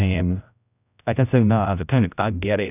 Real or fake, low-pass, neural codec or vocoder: fake; 3.6 kHz; codec, 16 kHz, 0.5 kbps, X-Codec, HuBERT features, trained on general audio